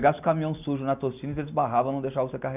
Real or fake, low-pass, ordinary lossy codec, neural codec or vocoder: real; 3.6 kHz; none; none